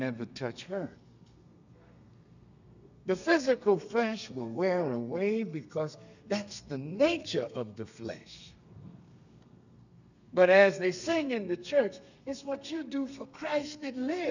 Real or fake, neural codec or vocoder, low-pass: fake; codec, 32 kHz, 1.9 kbps, SNAC; 7.2 kHz